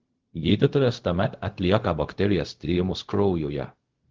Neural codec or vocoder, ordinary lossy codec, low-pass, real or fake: codec, 16 kHz, 0.4 kbps, LongCat-Audio-Codec; Opus, 16 kbps; 7.2 kHz; fake